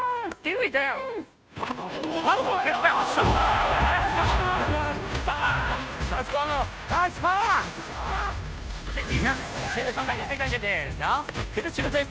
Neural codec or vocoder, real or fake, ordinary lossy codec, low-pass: codec, 16 kHz, 0.5 kbps, FunCodec, trained on Chinese and English, 25 frames a second; fake; none; none